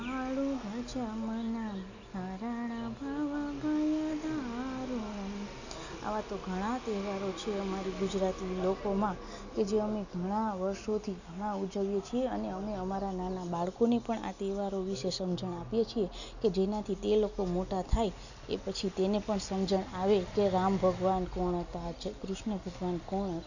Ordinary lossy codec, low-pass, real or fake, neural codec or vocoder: none; 7.2 kHz; real; none